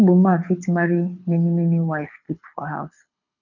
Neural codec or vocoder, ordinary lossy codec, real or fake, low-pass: codec, 24 kHz, 6 kbps, HILCodec; none; fake; 7.2 kHz